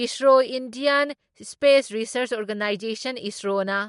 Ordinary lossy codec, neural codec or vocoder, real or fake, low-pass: MP3, 64 kbps; none; real; 10.8 kHz